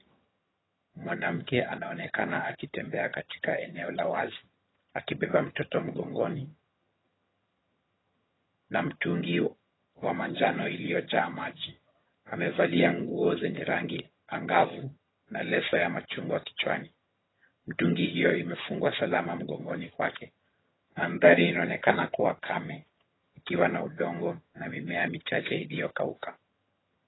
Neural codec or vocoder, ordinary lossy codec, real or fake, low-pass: vocoder, 22.05 kHz, 80 mel bands, HiFi-GAN; AAC, 16 kbps; fake; 7.2 kHz